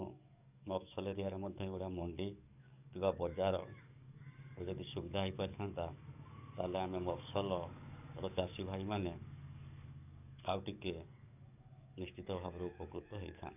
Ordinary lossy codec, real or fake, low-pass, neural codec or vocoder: none; fake; 3.6 kHz; codec, 44.1 kHz, 7.8 kbps, DAC